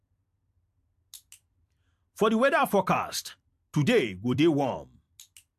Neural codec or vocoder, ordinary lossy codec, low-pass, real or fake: vocoder, 44.1 kHz, 128 mel bands every 256 samples, BigVGAN v2; MP3, 64 kbps; 14.4 kHz; fake